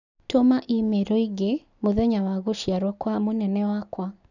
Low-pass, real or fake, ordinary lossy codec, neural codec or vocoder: 7.2 kHz; real; none; none